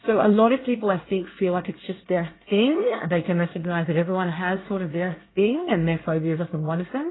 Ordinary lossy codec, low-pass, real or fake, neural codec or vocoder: AAC, 16 kbps; 7.2 kHz; fake; codec, 24 kHz, 1 kbps, SNAC